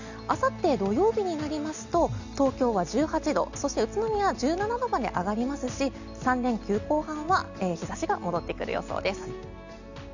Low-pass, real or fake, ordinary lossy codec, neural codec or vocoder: 7.2 kHz; real; none; none